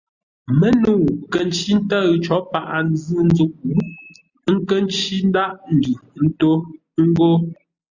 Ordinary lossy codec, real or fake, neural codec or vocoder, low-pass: Opus, 64 kbps; real; none; 7.2 kHz